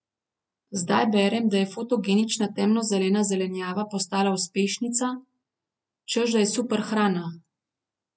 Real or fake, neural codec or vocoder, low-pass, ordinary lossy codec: real; none; none; none